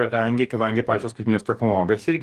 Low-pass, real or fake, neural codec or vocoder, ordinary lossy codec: 14.4 kHz; fake; codec, 44.1 kHz, 2.6 kbps, DAC; Opus, 24 kbps